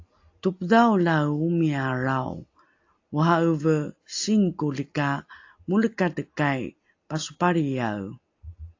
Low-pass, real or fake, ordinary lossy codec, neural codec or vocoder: 7.2 kHz; real; AAC, 48 kbps; none